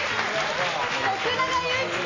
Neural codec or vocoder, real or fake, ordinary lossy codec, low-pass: none; real; AAC, 32 kbps; 7.2 kHz